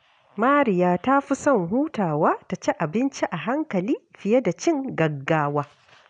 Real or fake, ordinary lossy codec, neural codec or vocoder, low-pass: real; none; none; 9.9 kHz